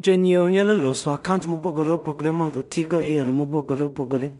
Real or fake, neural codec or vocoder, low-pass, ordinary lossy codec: fake; codec, 16 kHz in and 24 kHz out, 0.4 kbps, LongCat-Audio-Codec, two codebook decoder; 10.8 kHz; none